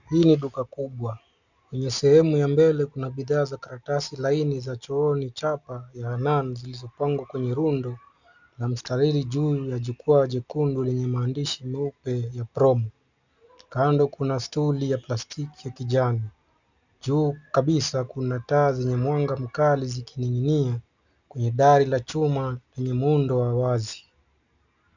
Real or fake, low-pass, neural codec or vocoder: real; 7.2 kHz; none